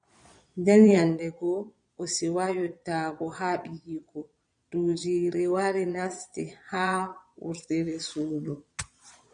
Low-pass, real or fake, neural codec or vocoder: 9.9 kHz; fake; vocoder, 22.05 kHz, 80 mel bands, Vocos